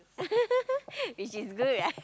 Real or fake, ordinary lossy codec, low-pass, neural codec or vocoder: real; none; none; none